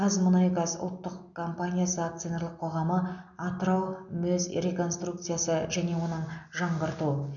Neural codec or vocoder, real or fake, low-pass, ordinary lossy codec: none; real; 7.2 kHz; none